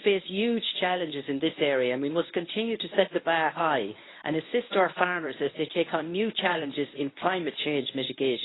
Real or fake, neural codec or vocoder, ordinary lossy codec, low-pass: fake; codec, 24 kHz, 0.9 kbps, WavTokenizer, medium speech release version 1; AAC, 16 kbps; 7.2 kHz